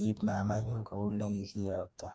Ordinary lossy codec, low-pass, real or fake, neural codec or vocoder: none; none; fake; codec, 16 kHz, 1 kbps, FreqCodec, larger model